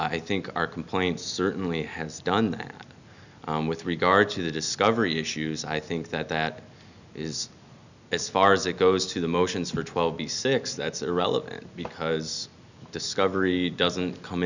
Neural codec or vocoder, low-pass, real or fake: none; 7.2 kHz; real